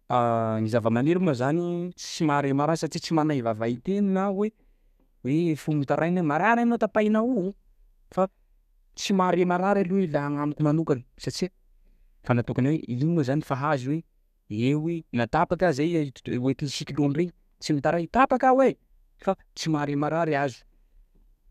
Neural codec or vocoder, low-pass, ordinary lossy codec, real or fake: codec, 32 kHz, 1.9 kbps, SNAC; 14.4 kHz; none; fake